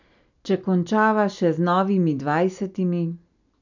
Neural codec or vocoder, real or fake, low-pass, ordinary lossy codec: none; real; 7.2 kHz; none